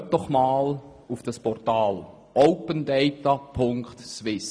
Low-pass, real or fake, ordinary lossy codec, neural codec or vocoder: none; real; none; none